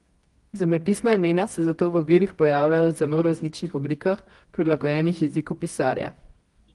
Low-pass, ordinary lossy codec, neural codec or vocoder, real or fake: 10.8 kHz; Opus, 24 kbps; codec, 24 kHz, 0.9 kbps, WavTokenizer, medium music audio release; fake